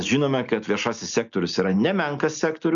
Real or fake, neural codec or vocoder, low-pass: real; none; 7.2 kHz